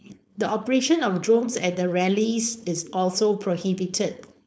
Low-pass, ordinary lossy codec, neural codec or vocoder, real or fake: none; none; codec, 16 kHz, 4.8 kbps, FACodec; fake